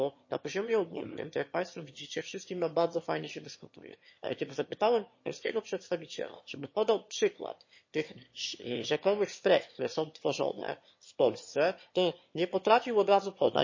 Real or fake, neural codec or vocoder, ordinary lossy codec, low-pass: fake; autoencoder, 22.05 kHz, a latent of 192 numbers a frame, VITS, trained on one speaker; MP3, 32 kbps; 7.2 kHz